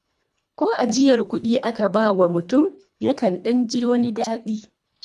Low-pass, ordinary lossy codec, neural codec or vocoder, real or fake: none; none; codec, 24 kHz, 1.5 kbps, HILCodec; fake